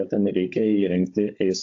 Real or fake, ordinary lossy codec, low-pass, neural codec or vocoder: fake; AAC, 48 kbps; 7.2 kHz; codec, 16 kHz, 16 kbps, FunCodec, trained on Chinese and English, 50 frames a second